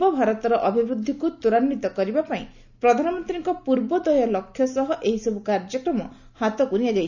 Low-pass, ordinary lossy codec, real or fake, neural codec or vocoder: 7.2 kHz; none; real; none